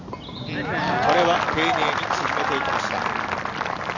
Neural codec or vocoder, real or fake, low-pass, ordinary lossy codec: none; real; 7.2 kHz; none